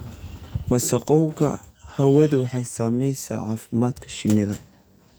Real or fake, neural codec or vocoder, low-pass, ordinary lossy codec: fake; codec, 44.1 kHz, 2.6 kbps, SNAC; none; none